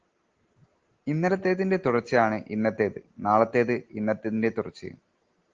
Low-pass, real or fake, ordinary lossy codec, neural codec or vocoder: 7.2 kHz; real; Opus, 16 kbps; none